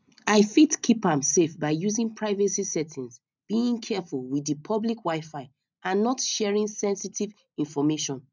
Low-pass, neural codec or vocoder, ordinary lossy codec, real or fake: 7.2 kHz; none; none; real